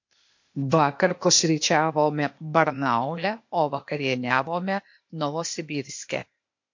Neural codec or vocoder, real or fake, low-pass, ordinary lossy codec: codec, 16 kHz, 0.8 kbps, ZipCodec; fake; 7.2 kHz; MP3, 48 kbps